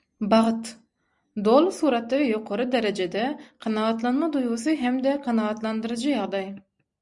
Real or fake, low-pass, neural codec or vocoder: real; 10.8 kHz; none